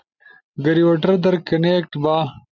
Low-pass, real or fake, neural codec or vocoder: 7.2 kHz; real; none